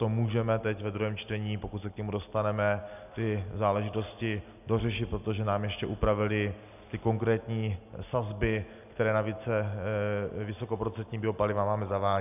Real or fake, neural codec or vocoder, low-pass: real; none; 3.6 kHz